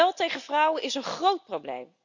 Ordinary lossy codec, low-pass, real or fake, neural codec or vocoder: none; 7.2 kHz; real; none